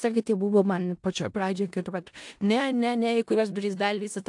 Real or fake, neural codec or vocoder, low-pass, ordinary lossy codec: fake; codec, 16 kHz in and 24 kHz out, 0.4 kbps, LongCat-Audio-Codec, four codebook decoder; 10.8 kHz; AAC, 64 kbps